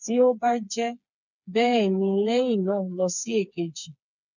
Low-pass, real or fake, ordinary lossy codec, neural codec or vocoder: 7.2 kHz; fake; none; codec, 16 kHz, 4 kbps, FreqCodec, smaller model